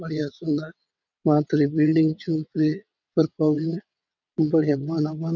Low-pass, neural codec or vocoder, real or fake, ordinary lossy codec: 7.2 kHz; vocoder, 22.05 kHz, 80 mel bands, WaveNeXt; fake; none